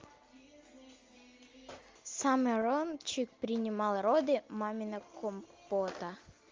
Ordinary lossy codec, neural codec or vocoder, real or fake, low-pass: Opus, 32 kbps; none; real; 7.2 kHz